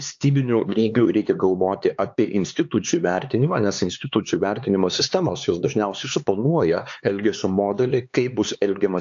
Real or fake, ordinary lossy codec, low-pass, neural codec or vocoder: fake; AAC, 64 kbps; 7.2 kHz; codec, 16 kHz, 4 kbps, X-Codec, HuBERT features, trained on LibriSpeech